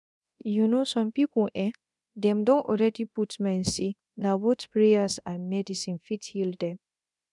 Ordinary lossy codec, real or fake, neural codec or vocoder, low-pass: none; fake; codec, 24 kHz, 0.9 kbps, DualCodec; 10.8 kHz